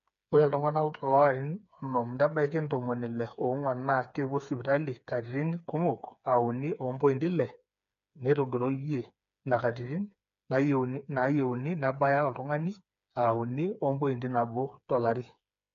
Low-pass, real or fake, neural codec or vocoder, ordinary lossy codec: 7.2 kHz; fake; codec, 16 kHz, 4 kbps, FreqCodec, smaller model; none